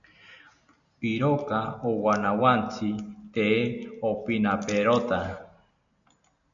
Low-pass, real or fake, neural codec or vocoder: 7.2 kHz; real; none